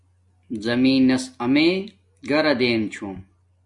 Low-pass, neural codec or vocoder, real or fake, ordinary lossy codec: 10.8 kHz; none; real; MP3, 64 kbps